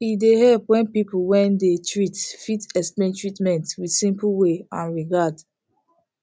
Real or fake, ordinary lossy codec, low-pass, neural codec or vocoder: real; none; none; none